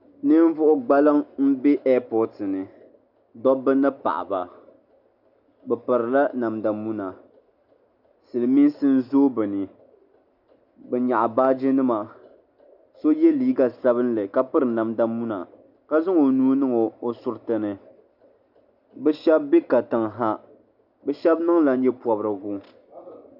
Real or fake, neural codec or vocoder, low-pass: real; none; 5.4 kHz